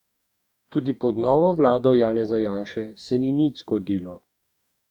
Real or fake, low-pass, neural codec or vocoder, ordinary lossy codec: fake; 19.8 kHz; codec, 44.1 kHz, 2.6 kbps, DAC; none